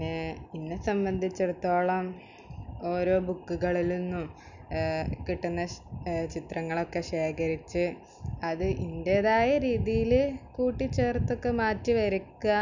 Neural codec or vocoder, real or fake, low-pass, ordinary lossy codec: none; real; 7.2 kHz; none